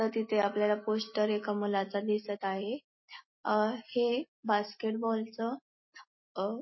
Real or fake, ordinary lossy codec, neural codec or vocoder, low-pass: real; MP3, 24 kbps; none; 7.2 kHz